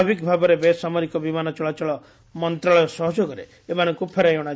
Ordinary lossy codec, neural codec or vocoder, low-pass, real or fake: none; none; none; real